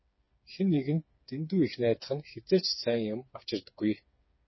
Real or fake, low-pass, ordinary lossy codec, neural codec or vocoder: fake; 7.2 kHz; MP3, 24 kbps; codec, 16 kHz, 4 kbps, FreqCodec, smaller model